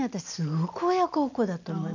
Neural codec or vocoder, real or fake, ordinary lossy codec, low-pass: none; real; none; 7.2 kHz